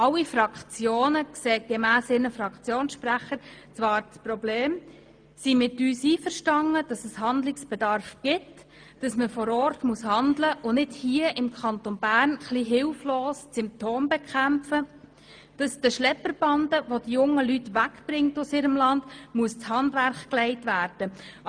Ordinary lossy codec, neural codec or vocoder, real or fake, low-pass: Opus, 32 kbps; none; real; 9.9 kHz